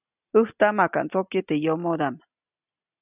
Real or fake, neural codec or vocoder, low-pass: real; none; 3.6 kHz